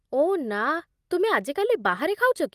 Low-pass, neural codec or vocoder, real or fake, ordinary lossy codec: 14.4 kHz; none; real; Opus, 32 kbps